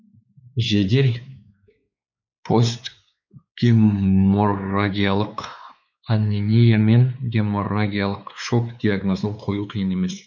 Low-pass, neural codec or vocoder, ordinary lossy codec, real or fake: 7.2 kHz; codec, 16 kHz, 4 kbps, X-Codec, WavLM features, trained on Multilingual LibriSpeech; none; fake